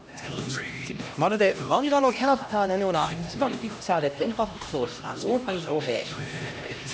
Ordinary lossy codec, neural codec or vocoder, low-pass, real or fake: none; codec, 16 kHz, 1 kbps, X-Codec, HuBERT features, trained on LibriSpeech; none; fake